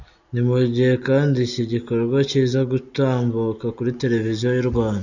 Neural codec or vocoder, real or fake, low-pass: none; real; 7.2 kHz